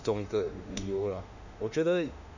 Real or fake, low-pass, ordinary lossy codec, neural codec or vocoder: fake; 7.2 kHz; none; autoencoder, 48 kHz, 32 numbers a frame, DAC-VAE, trained on Japanese speech